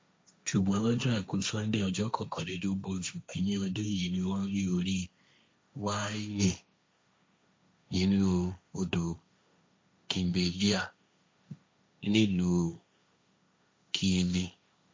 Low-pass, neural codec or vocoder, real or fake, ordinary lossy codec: none; codec, 16 kHz, 1.1 kbps, Voila-Tokenizer; fake; none